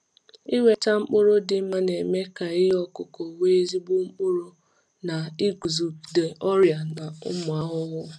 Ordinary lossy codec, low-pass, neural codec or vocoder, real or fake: none; none; none; real